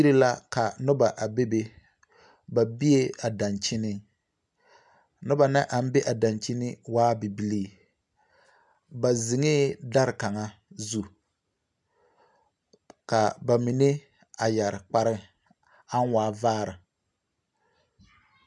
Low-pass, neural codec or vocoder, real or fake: 10.8 kHz; none; real